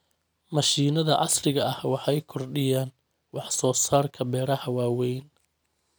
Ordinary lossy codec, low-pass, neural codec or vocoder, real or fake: none; none; none; real